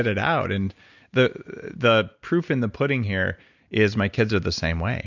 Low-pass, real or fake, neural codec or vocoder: 7.2 kHz; real; none